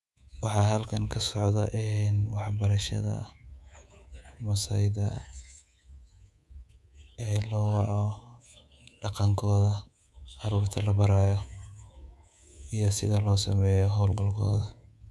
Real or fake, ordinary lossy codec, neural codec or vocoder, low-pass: fake; none; codec, 24 kHz, 3.1 kbps, DualCodec; none